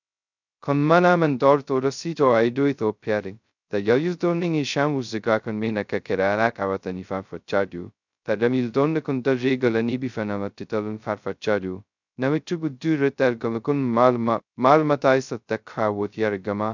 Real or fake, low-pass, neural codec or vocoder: fake; 7.2 kHz; codec, 16 kHz, 0.2 kbps, FocalCodec